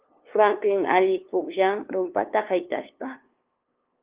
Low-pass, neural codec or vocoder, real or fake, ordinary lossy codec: 3.6 kHz; codec, 16 kHz, 2 kbps, FunCodec, trained on LibriTTS, 25 frames a second; fake; Opus, 32 kbps